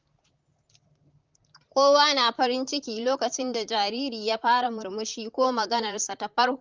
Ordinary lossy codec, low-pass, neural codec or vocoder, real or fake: Opus, 24 kbps; 7.2 kHz; vocoder, 44.1 kHz, 128 mel bands, Pupu-Vocoder; fake